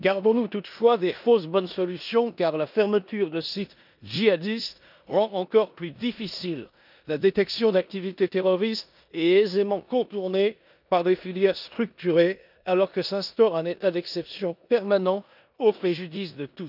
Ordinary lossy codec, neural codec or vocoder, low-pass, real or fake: none; codec, 16 kHz in and 24 kHz out, 0.9 kbps, LongCat-Audio-Codec, four codebook decoder; 5.4 kHz; fake